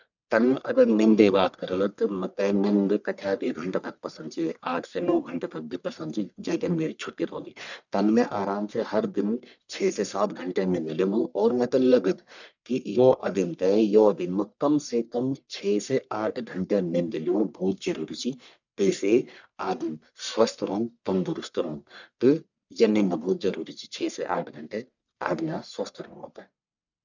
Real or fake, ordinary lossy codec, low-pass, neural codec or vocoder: fake; none; 7.2 kHz; codec, 44.1 kHz, 1.7 kbps, Pupu-Codec